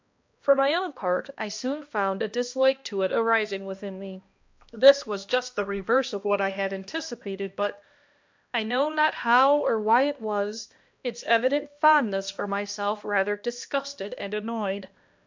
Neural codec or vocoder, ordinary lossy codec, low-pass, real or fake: codec, 16 kHz, 1 kbps, X-Codec, HuBERT features, trained on balanced general audio; MP3, 64 kbps; 7.2 kHz; fake